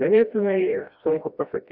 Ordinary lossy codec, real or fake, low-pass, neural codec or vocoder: Opus, 64 kbps; fake; 5.4 kHz; codec, 16 kHz, 1 kbps, FreqCodec, smaller model